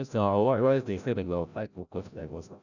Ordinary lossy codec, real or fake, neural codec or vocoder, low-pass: none; fake; codec, 16 kHz, 0.5 kbps, FreqCodec, larger model; 7.2 kHz